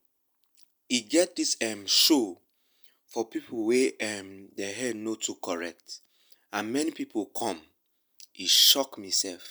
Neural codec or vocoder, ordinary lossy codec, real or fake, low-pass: none; none; real; none